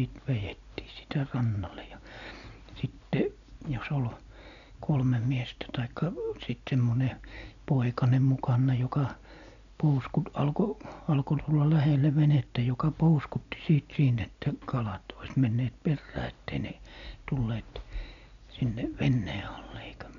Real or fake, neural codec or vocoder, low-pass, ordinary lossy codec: real; none; 7.2 kHz; none